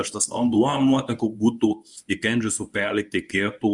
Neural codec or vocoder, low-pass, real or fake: codec, 24 kHz, 0.9 kbps, WavTokenizer, medium speech release version 1; 10.8 kHz; fake